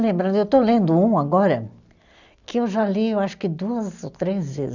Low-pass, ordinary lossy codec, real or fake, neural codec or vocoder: 7.2 kHz; none; real; none